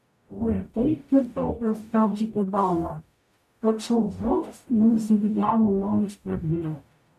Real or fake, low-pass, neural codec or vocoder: fake; 14.4 kHz; codec, 44.1 kHz, 0.9 kbps, DAC